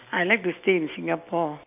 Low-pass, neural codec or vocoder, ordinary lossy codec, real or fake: 3.6 kHz; none; none; real